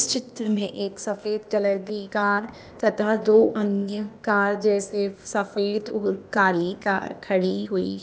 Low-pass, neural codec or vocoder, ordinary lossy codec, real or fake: none; codec, 16 kHz, 0.8 kbps, ZipCodec; none; fake